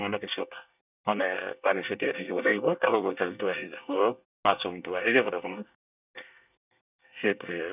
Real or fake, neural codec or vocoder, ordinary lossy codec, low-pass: fake; codec, 24 kHz, 1 kbps, SNAC; none; 3.6 kHz